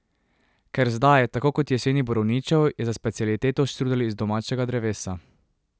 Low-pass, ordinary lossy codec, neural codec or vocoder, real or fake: none; none; none; real